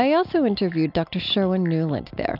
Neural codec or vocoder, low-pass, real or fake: none; 5.4 kHz; real